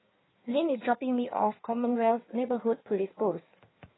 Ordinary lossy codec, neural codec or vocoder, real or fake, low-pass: AAC, 16 kbps; codec, 16 kHz in and 24 kHz out, 1.1 kbps, FireRedTTS-2 codec; fake; 7.2 kHz